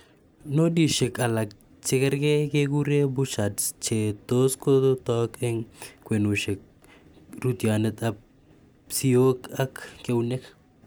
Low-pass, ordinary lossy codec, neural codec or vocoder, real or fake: none; none; none; real